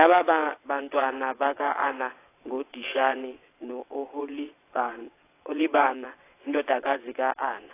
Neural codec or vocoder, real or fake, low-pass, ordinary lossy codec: vocoder, 22.05 kHz, 80 mel bands, WaveNeXt; fake; 3.6 kHz; AAC, 24 kbps